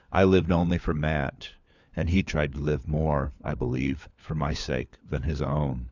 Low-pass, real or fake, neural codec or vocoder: 7.2 kHz; fake; codec, 16 kHz, 4 kbps, FunCodec, trained on LibriTTS, 50 frames a second